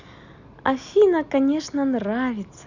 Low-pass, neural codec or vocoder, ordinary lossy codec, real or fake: 7.2 kHz; none; none; real